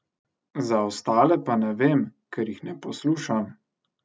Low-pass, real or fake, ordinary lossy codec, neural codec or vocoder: none; real; none; none